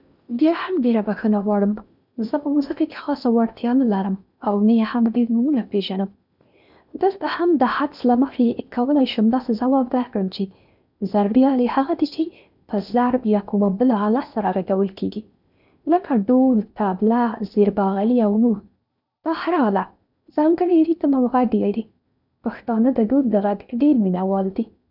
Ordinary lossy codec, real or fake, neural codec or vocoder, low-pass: none; fake; codec, 16 kHz in and 24 kHz out, 0.8 kbps, FocalCodec, streaming, 65536 codes; 5.4 kHz